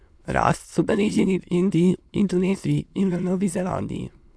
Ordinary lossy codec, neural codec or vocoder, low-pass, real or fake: none; autoencoder, 22.05 kHz, a latent of 192 numbers a frame, VITS, trained on many speakers; none; fake